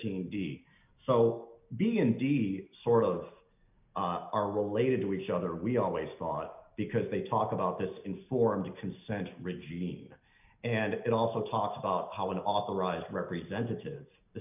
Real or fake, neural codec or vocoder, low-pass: real; none; 3.6 kHz